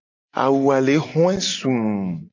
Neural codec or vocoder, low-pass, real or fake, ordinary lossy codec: none; 7.2 kHz; real; AAC, 48 kbps